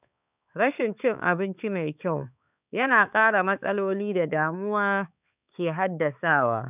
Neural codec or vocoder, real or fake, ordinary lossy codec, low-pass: codec, 16 kHz, 4 kbps, X-Codec, HuBERT features, trained on balanced general audio; fake; none; 3.6 kHz